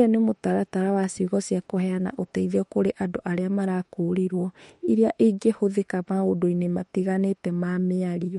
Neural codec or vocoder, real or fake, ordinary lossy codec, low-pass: autoencoder, 48 kHz, 32 numbers a frame, DAC-VAE, trained on Japanese speech; fake; MP3, 48 kbps; 19.8 kHz